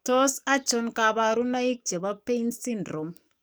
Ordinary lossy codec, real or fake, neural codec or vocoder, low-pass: none; fake; codec, 44.1 kHz, 7.8 kbps, DAC; none